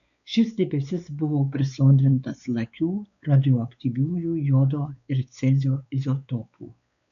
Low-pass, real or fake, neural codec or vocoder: 7.2 kHz; fake; codec, 16 kHz, 4 kbps, X-Codec, WavLM features, trained on Multilingual LibriSpeech